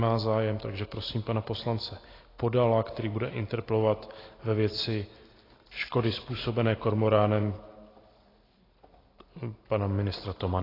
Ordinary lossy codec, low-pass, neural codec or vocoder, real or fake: AAC, 24 kbps; 5.4 kHz; none; real